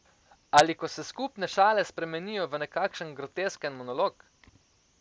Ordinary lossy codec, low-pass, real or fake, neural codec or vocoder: none; none; real; none